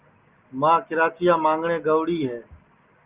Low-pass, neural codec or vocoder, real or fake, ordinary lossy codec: 3.6 kHz; none; real; Opus, 32 kbps